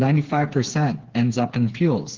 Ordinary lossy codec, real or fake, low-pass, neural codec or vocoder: Opus, 16 kbps; fake; 7.2 kHz; codec, 16 kHz, 4 kbps, FreqCodec, smaller model